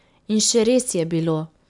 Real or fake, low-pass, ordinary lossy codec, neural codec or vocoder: real; 10.8 kHz; none; none